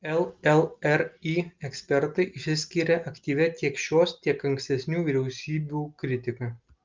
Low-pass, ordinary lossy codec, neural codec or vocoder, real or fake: 7.2 kHz; Opus, 24 kbps; none; real